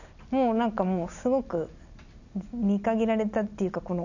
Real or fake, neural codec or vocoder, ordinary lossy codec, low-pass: real; none; none; 7.2 kHz